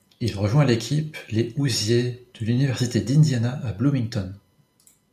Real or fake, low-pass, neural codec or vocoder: real; 14.4 kHz; none